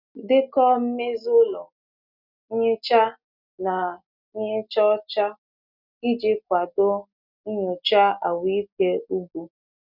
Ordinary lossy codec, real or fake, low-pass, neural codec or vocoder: none; real; 5.4 kHz; none